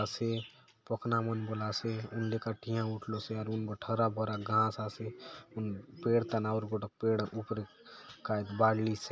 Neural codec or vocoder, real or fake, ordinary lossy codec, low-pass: none; real; none; none